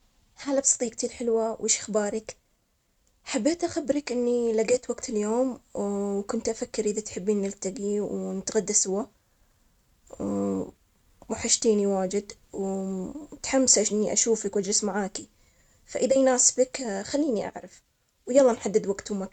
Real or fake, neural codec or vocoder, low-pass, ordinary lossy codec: real; none; 19.8 kHz; none